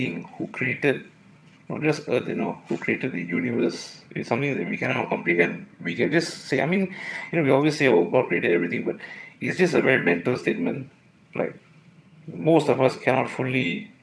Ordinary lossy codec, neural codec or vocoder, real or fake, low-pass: none; vocoder, 22.05 kHz, 80 mel bands, HiFi-GAN; fake; none